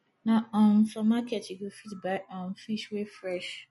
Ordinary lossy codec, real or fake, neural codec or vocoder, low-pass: MP3, 48 kbps; real; none; 19.8 kHz